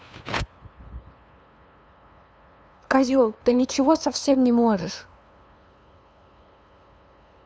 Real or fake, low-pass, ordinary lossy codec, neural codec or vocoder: fake; none; none; codec, 16 kHz, 2 kbps, FunCodec, trained on LibriTTS, 25 frames a second